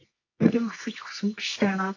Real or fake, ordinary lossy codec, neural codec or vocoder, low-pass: fake; AAC, 32 kbps; codec, 24 kHz, 0.9 kbps, WavTokenizer, medium music audio release; 7.2 kHz